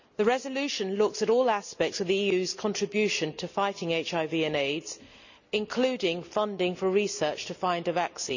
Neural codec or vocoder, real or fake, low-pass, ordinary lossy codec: none; real; 7.2 kHz; none